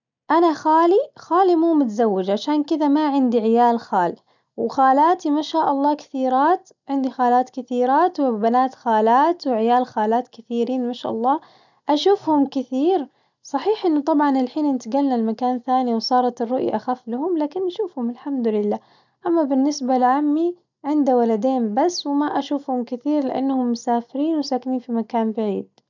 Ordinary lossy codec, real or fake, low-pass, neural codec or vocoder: none; real; 7.2 kHz; none